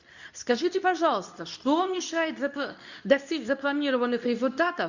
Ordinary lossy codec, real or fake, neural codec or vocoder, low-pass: none; fake; codec, 24 kHz, 0.9 kbps, WavTokenizer, medium speech release version 2; 7.2 kHz